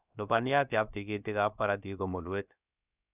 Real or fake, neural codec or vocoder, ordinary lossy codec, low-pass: fake; codec, 16 kHz, about 1 kbps, DyCAST, with the encoder's durations; none; 3.6 kHz